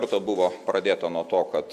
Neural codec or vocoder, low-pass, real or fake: none; 14.4 kHz; real